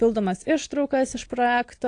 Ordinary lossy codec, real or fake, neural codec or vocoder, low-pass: AAC, 48 kbps; real; none; 9.9 kHz